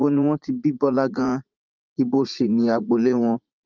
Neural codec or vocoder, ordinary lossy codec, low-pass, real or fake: vocoder, 44.1 kHz, 80 mel bands, Vocos; Opus, 24 kbps; 7.2 kHz; fake